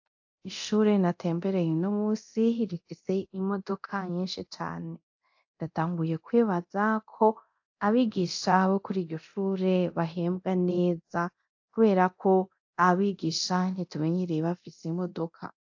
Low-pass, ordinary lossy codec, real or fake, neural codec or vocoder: 7.2 kHz; AAC, 48 kbps; fake; codec, 24 kHz, 0.9 kbps, DualCodec